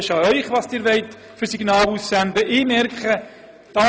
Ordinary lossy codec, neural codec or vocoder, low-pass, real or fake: none; none; none; real